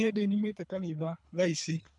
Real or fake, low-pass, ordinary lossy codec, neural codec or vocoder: fake; 10.8 kHz; MP3, 96 kbps; codec, 24 kHz, 3 kbps, HILCodec